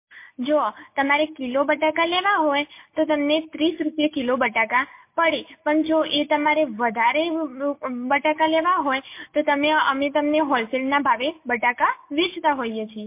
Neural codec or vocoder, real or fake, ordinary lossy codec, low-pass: none; real; MP3, 24 kbps; 3.6 kHz